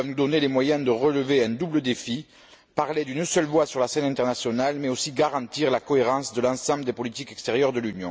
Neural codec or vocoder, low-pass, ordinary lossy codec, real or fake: none; none; none; real